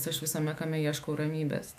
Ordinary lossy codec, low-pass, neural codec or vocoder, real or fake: AAC, 96 kbps; 14.4 kHz; autoencoder, 48 kHz, 128 numbers a frame, DAC-VAE, trained on Japanese speech; fake